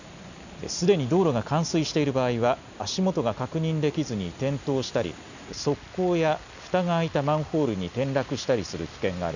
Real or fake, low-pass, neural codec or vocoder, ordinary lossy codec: real; 7.2 kHz; none; none